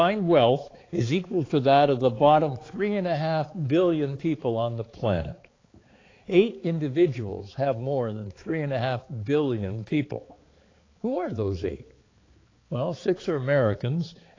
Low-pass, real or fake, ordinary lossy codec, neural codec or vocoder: 7.2 kHz; fake; AAC, 32 kbps; codec, 16 kHz, 4 kbps, X-Codec, HuBERT features, trained on balanced general audio